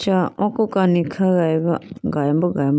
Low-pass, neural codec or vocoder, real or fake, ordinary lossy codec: none; none; real; none